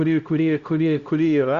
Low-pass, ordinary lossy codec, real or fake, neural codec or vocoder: 7.2 kHz; Opus, 64 kbps; fake; codec, 16 kHz, 0.5 kbps, X-Codec, HuBERT features, trained on LibriSpeech